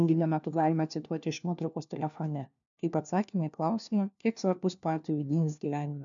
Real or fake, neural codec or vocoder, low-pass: fake; codec, 16 kHz, 1 kbps, FunCodec, trained on LibriTTS, 50 frames a second; 7.2 kHz